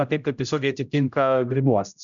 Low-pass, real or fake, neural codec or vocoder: 7.2 kHz; fake; codec, 16 kHz, 0.5 kbps, X-Codec, HuBERT features, trained on general audio